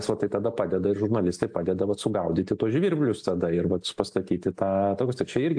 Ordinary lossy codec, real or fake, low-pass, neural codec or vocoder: AAC, 64 kbps; real; 9.9 kHz; none